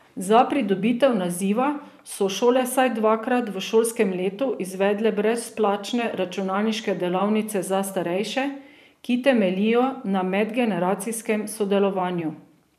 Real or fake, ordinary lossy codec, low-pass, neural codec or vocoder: fake; none; 14.4 kHz; vocoder, 44.1 kHz, 128 mel bands every 512 samples, BigVGAN v2